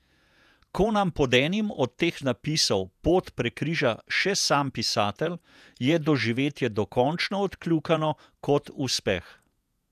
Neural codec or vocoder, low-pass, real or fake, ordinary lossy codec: vocoder, 48 kHz, 128 mel bands, Vocos; 14.4 kHz; fake; none